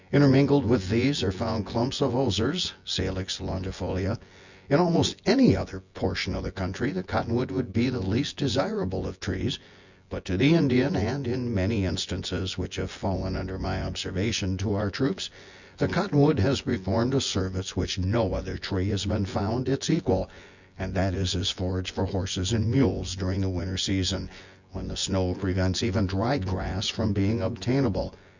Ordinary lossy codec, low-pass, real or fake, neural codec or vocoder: Opus, 64 kbps; 7.2 kHz; fake; vocoder, 24 kHz, 100 mel bands, Vocos